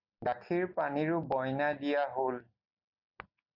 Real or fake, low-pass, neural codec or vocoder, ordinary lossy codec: real; 5.4 kHz; none; Opus, 64 kbps